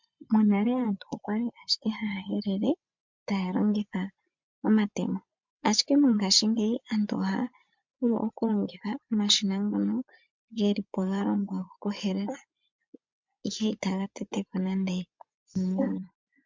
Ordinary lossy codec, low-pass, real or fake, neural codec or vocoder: MP3, 64 kbps; 7.2 kHz; fake; vocoder, 24 kHz, 100 mel bands, Vocos